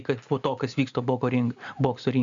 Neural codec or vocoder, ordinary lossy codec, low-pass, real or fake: none; AAC, 64 kbps; 7.2 kHz; real